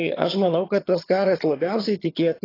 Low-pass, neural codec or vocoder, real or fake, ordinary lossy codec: 5.4 kHz; vocoder, 22.05 kHz, 80 mel bands, HiFi-GAN; fake; AAC, 24 kbps